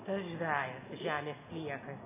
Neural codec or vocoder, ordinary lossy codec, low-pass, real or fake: none; MP3, 16 kbps; 3.6 kHz; real